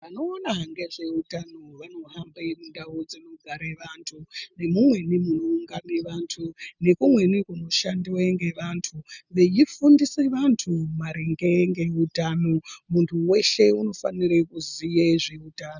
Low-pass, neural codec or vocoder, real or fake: 7.2 kHz; none; real